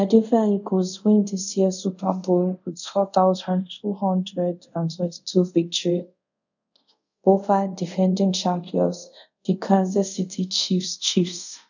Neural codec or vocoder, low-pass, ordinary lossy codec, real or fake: codec, 24 kHz, 0.5 kbps, DualCodec; 7.2 kHz; none; fake